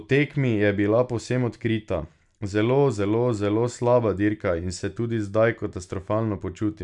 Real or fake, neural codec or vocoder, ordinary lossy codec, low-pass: real; none; none; 10.8 kHz